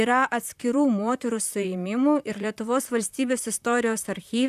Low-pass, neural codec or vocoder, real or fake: 14.4 kHz; vocoder, 44.1 kHz, 128 mel bands, Pupu-Vocoder; fake